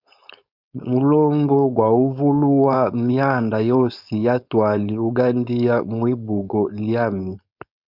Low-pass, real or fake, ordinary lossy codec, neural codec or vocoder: 5.4 kHz; fake; Opus, 64 kbps; codec, 16 kHz, 4.8 kbps, FACodec